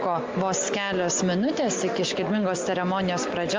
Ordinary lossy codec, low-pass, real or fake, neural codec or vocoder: Opus, 32 kbps; 7.2 kHz; real; none